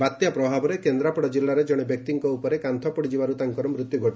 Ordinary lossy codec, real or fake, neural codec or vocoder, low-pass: none; real; none; none